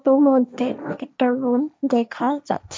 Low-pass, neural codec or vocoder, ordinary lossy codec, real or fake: none; codec, 16 kHz, 1.1 kbps, Voila-Tokenizer; none; fake